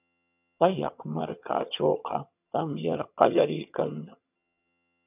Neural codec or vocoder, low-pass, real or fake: vocoder, 22.05 kHz, 80 mel bands, HiFi-GAN; 3.6 kHz; fake